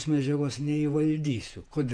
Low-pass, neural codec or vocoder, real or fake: 9.9 kHz; vocoder, 48 kHz, 128 mel bands, Vocos; fake